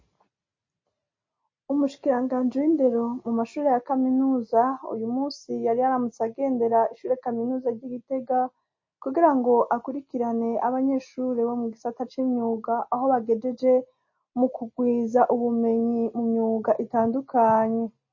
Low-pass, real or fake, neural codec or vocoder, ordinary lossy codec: 7.2 kHz; real; none; MP3, 32 kbps